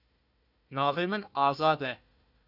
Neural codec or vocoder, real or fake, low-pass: codec, 16 kHz, 1 kbps, FunCodec, trained on Chinese and English, 50 frames a second; fake; 5.4 kHz